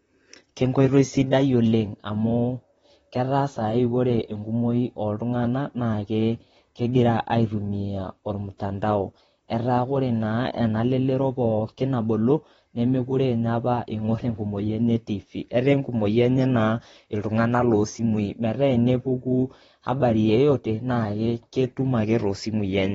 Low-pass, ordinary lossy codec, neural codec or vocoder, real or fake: 19.8 kHz; AAC, 24 kbps; vocoder, 44.1 kHz, 128 mel bands, Pupu-Vocoder; fake